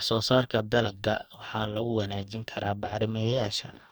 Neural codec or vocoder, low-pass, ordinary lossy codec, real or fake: codec, 44.1 kHz, 2.6 kbps, DAC; none; none; fake